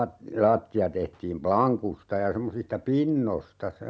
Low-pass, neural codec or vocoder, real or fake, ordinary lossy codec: none; none; real; none